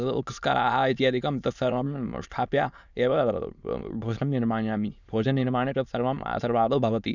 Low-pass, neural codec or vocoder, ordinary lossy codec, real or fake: 7.2 kHz; autoencoder, 22.05 kHz, a latent of 192 numbers a frame, VITS, trained on many speakers; none; fake